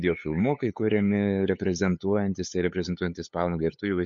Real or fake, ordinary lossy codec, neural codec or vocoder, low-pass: fake; MP3, 48 kbps; codec, 16 kHz, 8 kbps, FunCodec, trained on LibriTTS, 25 frames a second; 7.2 kHz